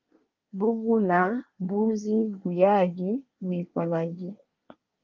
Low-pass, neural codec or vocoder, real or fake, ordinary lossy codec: 7.2 kHz; codec, 24 kHz, 1 kbps, SNAC; fake; Opus, 32 kbps